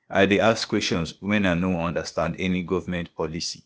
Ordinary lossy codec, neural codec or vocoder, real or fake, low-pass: none; codec, 16 kHz, 0.8 kbps, ZipCodec; fake; none